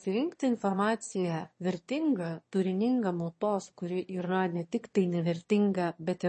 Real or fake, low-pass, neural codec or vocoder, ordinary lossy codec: fake; 9.9 kHz; autoencoder, 22.05 kHz, a latent of 192 numbers a frame, VITS, trained on one speaker; MP3, 32 kbps